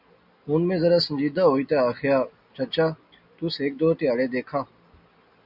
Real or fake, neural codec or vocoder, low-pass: real; none; 5.4 kHz